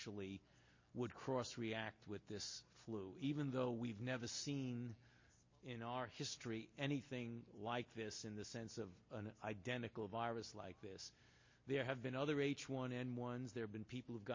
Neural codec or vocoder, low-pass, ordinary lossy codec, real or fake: none; 7.2 kHz; MP3, 32 kbps; real